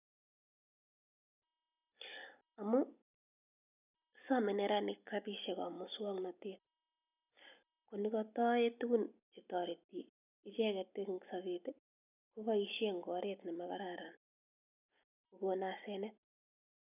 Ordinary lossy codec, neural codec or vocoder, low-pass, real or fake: none; none; 3.6 kHz; real